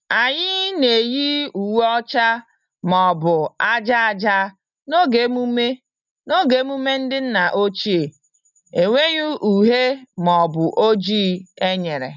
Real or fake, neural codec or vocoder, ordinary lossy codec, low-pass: real; none; none; 7.2 kHz